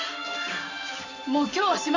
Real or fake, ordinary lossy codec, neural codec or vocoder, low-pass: real; AAC, 48 kbps; none; 7.2 kHz